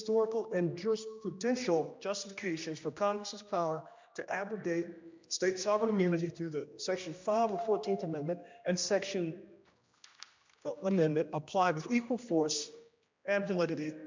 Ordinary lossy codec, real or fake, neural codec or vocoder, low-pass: MP3, 64 kbps; fake; codec, 16 kHz, 1 kbps, X-Codec, HuBERT features, trained on general audio; 7.2 kHz